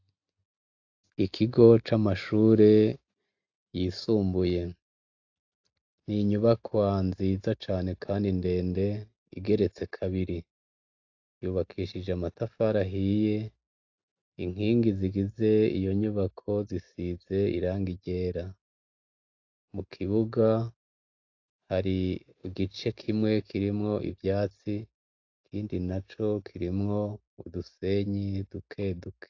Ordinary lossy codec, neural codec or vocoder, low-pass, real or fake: AAC, 48 kbps; none; 7.2 kHz; real